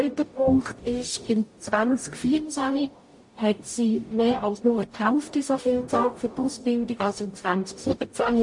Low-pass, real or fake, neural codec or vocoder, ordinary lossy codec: 10.8 kHz; fake; codec, 44.1 kHz, 0.9 kbps, DAC; MP3, 48 kbps